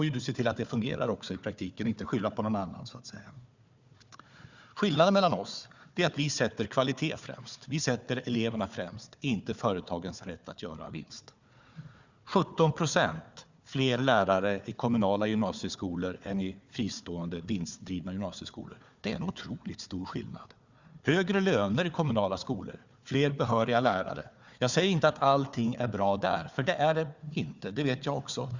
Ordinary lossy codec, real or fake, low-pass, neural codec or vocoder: Opus, 64 kbps; fake; 7.2 kHz; codec, 16 kHz, 4 kbps, FunCodec, trained on Chinese and English, 50 frames a second